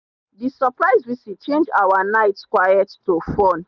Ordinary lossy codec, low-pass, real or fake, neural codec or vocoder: none; 7.2 kHz; real; none